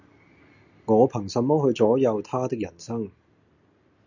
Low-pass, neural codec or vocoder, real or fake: 7.2 kHz; none; real